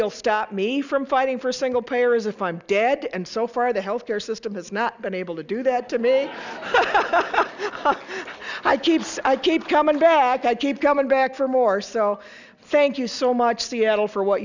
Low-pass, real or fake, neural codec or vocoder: 7.2 kHz; real; none